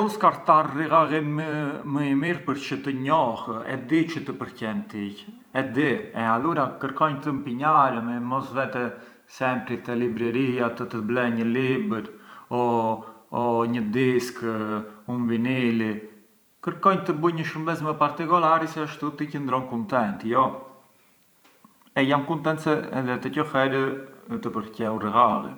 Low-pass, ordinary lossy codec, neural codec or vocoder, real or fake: none; none; vocoder, 44.1 kHz, 128 mel bands every 512 samples, BigVGAN v2; fake